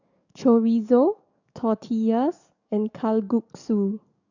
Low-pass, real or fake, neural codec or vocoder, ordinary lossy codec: 7.2 kHz; fake; codec, 44.1 kHz, 7.8 kbps, DAC; none